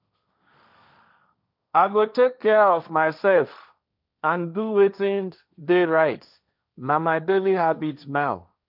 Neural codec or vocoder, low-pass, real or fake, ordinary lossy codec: codec, 16 kHz, 1.1 kbps, Voila-Tokenizer; 5.4 kHz; fake; none